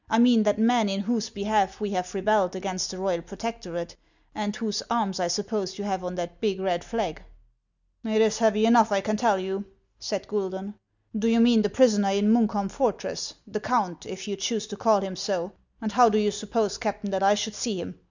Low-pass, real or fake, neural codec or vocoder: 7.2 kHz; real; none